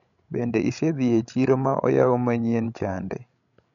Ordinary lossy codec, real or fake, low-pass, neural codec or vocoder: none; fake; 7.2 kHz; codec, 16 kHz, 16 kbps, FreqCodec, larger model